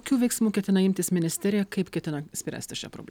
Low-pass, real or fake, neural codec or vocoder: 19.8 kHz; real; none